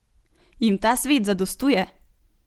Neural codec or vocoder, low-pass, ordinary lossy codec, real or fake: none; 19.8 kHz; Opus, 16 kbps; real